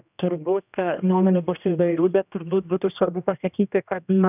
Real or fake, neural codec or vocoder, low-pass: fake; codec, 16 kHz, 1 kbps, X-Codec, HuBERT features, trained on general audio; 3.6 kHz